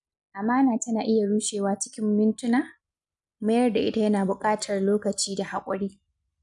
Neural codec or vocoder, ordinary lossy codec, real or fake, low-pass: none; none; real; 10.8 kHz